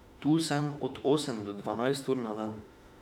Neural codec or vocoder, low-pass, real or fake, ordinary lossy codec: autoencoder, 48 kHz, 32 numbers a frame, DAC-VAE, trained on Japanese speech; 19.8 kHz; fake; none